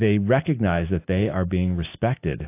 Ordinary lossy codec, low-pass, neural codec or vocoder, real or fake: AAC, 24 kbps; 3.6 kHz; none; real